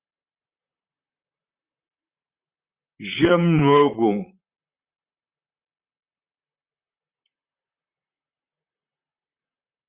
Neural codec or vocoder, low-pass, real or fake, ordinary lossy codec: vocoder, 44.1 kHz, 128 mel bands, Pupu-Vocoder; 3.6 kHz; fake; Opus, 64 kbps